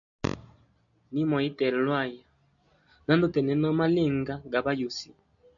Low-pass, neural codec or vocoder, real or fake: 7.2 kHz; none; real